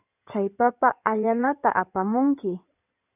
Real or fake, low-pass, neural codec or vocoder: fake; 3.6 kHz; codec, 16 kHz in and 24 kHz out, 2.2 kbps, FireRedTTS-2 codec